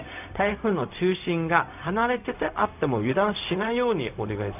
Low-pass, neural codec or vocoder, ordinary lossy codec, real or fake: 3.6 kHz; codec, 16 kHz, 0.4 kbps, LongCat-Audio-Codec; none; fake